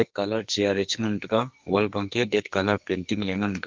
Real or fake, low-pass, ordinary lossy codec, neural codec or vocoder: fake; 7.2 kHz; Opus, 24 kbps; codec, 44.1 kHz, 2.6 kbps, SNAC